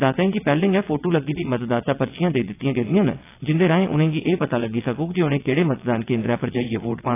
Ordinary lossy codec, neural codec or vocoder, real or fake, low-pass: AAC, 24 kbps; vocoder, 22.05 kHz, 80 mel bands, WaveNeXt; fake; 3.6 kHz